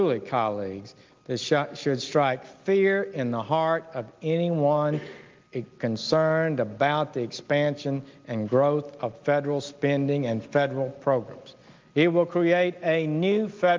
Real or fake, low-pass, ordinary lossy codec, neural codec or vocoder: real; 7.2 kHz; Opus, 32 kbps; none